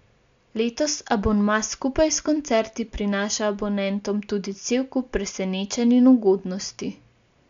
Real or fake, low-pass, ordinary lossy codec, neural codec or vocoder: real; 7.2 kHz; MP3, 64 kbps; none